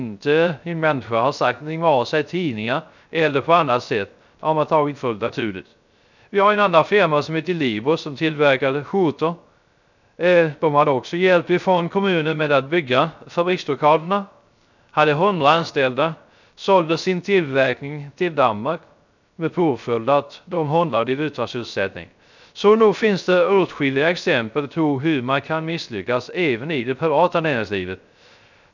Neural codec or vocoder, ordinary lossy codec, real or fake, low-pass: codec, 16 kHz, 0.3 kbps, FocalCodec; none; fake; 7.2 kHz